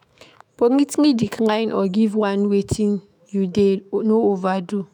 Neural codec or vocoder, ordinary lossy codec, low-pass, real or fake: autoencoder, 48 kHz, 128 numbers a frame, DAC-VAE, trained on Japanese speech; none; 19.8 kHz; fake